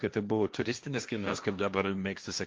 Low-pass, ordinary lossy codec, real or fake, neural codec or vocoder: 7.2 kHz; Opus, 24 kbps; fake; codec, 16 kHz, 1.1 kbps, Voila-Tokenizer